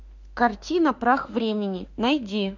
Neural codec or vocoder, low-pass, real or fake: autoencoder, 48 kHz, 32 numbers a frame, DAC-VAE, trained on Japanese speech; 7.2 kHz; fake